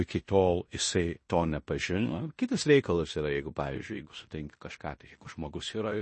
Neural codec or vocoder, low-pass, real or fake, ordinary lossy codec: codec, 16 kHz in and 24 kHz out, 0.9 kbps, LongCat-Audio-Codec, fine tuned four codebook decoder; 10.8 kHz; fake; MP3, 32 kbps